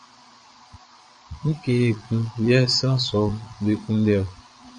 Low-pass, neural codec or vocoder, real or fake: 9.9 kHz; vocoder, 22.05 kHz, 80 mel bands, Vocos; fake